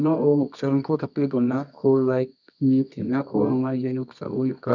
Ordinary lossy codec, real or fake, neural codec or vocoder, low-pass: none; fake; codec, 24 kHz, 0.9 kbps, WavTokenizer, medium music audio release; 7.2 kHz